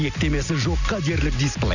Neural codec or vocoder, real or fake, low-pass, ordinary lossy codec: none; real; 7.2 kHz; none